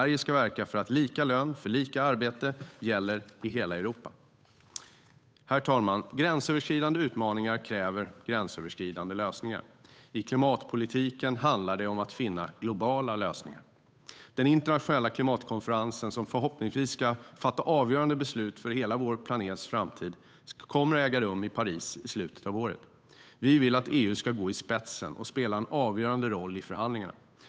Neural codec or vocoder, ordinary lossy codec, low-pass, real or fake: codec, 16 kHz, 8 kbps, FunCodec, trained on Chinese and English, 25 frames a second; none; none; fake